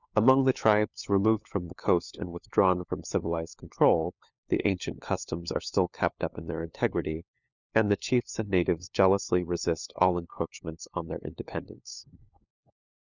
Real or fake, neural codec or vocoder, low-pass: fake; codec, 16 kHz, 4 kbps, FunCodec, trained on LibriTTS, 50 frames a second; 7.2 kHz